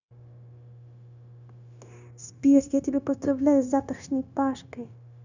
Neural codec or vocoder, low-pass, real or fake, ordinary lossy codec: codec, 16 kHz, 0.9 kbps, LongCat-Audio-Codec; 7.2 kHz; fake; none